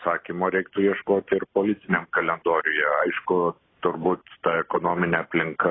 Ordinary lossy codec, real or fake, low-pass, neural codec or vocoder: AAC, 16 kbps; real; 7.2 kHz; none